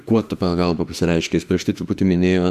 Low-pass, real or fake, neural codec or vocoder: 14.4 kHz; fake; autoencoder, 48 kHz, 32 numbers a frame, DAC-VAE, trained on Japanese speech